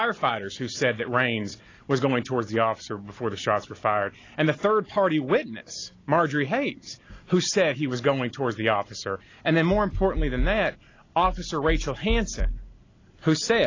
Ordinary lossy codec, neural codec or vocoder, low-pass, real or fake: AAC, 32 kbps; none; 7.2 kHz; real